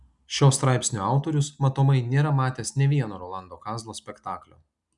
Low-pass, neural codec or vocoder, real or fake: 10.8 kHz; none; real